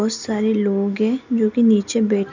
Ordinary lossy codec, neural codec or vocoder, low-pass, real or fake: none; none; 7.2 kHz; real